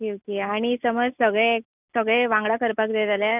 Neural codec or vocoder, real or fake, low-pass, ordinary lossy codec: none; real; 3.6 kHz; none